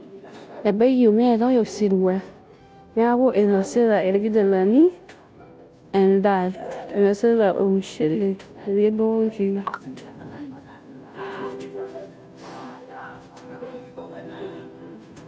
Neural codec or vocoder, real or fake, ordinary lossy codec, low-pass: codec, 16 kHz, 0.5 kbps, FunCodec, trained on Chinese and English, 25 frames a second; fake; none; none